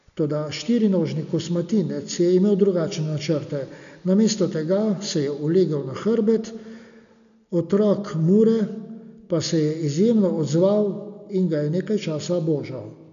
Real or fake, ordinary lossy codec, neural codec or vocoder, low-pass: real; none; none; 7.2 kHz